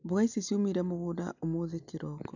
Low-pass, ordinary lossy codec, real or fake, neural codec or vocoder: 7.2 kHz; none; real; none